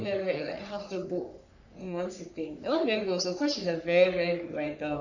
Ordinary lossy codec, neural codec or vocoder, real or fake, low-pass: none; codec, 44.1 kHz, 3.4 kbps, Pupu-Codec; fake; 7.2 kHz